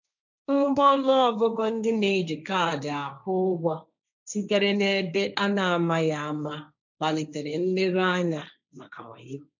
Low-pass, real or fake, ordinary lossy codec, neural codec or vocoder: 7.2 kHz; fake; none; codec, 16 kHz, 1.1 kbps, Voila-Tokenizer